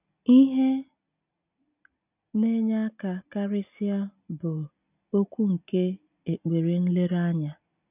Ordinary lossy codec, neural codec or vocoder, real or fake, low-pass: none; none; real; 3.6 kHz